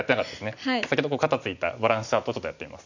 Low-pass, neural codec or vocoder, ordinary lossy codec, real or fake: 7.2 kHz; none; none; real